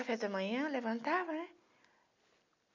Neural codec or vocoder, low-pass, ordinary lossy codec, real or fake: none; 7.2 kHz; MP3, 64 kbps; real